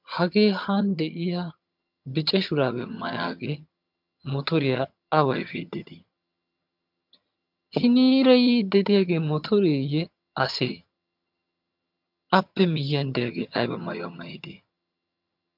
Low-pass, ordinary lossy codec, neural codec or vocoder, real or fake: 5.4 kHz; MP3, 48 kbps; vocoder, 22.05 kHz, 80 mel bands, HiFi-GAN; fake